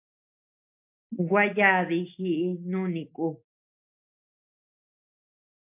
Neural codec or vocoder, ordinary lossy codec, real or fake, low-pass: none; MP3, 32 kbps; real; 3.6 kHz